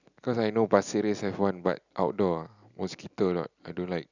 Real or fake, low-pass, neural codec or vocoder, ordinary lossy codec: real; 7.2 kHz; none; none